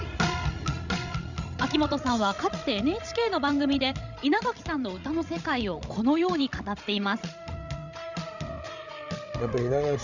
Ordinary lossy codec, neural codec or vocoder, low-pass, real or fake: none; codec, 16 kHz, 16 kbps, FreqCodec, larger model; 7.2 kHz; fake